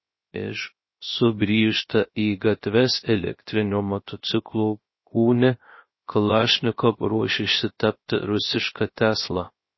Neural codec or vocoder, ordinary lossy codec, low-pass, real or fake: codec, 16 kHz, 0.3 kbps, FocalCodec; MP3, 24 kbps; 7.2 kHz; fake